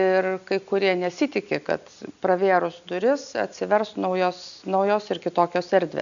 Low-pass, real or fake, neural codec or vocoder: 7.2 kHz; real; none